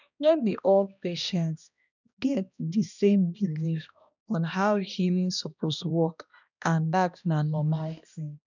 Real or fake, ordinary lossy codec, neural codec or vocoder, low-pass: fake; none; codec, 16 kHz, 1 kbps, X-Codec, HuBERT features, trained on balanced general audio; 7.2 kHz